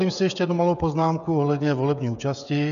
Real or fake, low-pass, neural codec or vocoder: fake; 7.2 kHz; codec, 16 kHz, 16 kbps, FreqCodec, smaller model